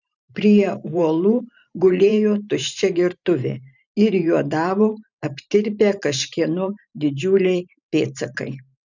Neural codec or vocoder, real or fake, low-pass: vocoder, 44.1 kHz, 128 mel bands every 512 samples, BigVGAN v2; fake; 7.2 kHz